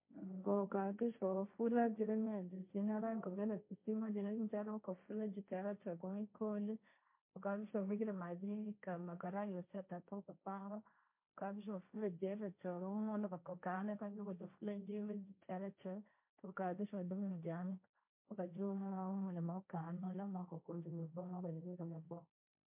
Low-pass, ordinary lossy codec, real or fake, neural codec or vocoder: 3.6 kHz; MP3, 32 kbps; fake; codec, 16 kHz, 1.1 kbps, Voila-Tokenizer